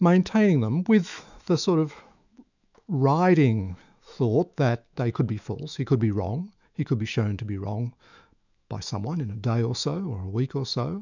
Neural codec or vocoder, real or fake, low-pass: autoencoder, 48 kHz, 128 numbers a frame, DAC-VAE, trained on Japanese speech; fake; 7.2 kHz